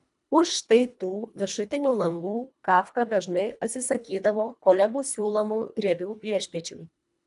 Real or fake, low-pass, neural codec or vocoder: fake; 10.8 kHz; codec, 24 kHz, 1.5 kbps, HILCodec